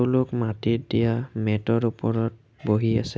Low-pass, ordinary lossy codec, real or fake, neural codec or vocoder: none; none; real; none